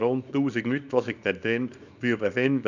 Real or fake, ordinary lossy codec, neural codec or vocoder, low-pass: fake; none; codec, 24 kHz, 0.9 kbps, WavTokenizer, small release; 7.2 kHz